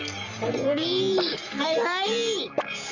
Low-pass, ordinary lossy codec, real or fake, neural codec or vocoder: 7.2 kHz; none; fake; codec, 44.1 kHz, 3.4 kbps, Pupu-Codec